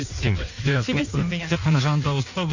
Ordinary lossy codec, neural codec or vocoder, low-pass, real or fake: none; codec, 16 kHz in and 24 kHz out, 1.1 kbps, FireRedTTS-2 codec; 7.2 kHz; fake